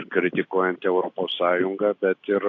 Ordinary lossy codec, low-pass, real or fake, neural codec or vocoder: AAC, 48 kbps; 7.2 kHz; real; none